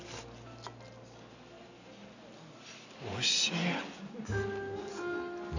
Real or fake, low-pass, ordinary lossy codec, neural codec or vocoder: real; 7.2 kHz; none; none